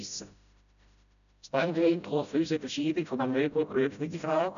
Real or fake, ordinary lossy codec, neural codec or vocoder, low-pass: fake; none; codec, 16 kHz, 0.5 kbps, FreqCodec, smaller model; 7.2 kHz